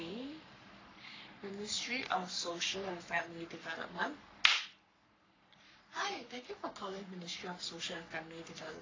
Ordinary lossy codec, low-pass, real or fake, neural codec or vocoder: AAC, 32 kbps; 7.2 kHz; fake; codec, 44.1 kHz, 3.4 kbps, Pupu-Codec